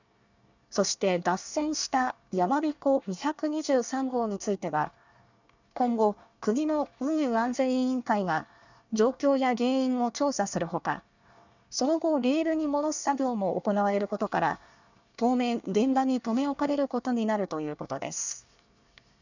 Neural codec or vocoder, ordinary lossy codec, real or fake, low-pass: codec, 24 kHz, 1 kbps, SNAC; none; fake; 7.2 kHz